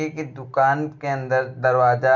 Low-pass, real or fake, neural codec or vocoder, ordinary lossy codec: 7.2 kHz; real; none; none